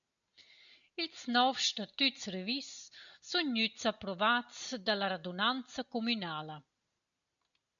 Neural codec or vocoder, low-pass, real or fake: none; 7.2 kHz; real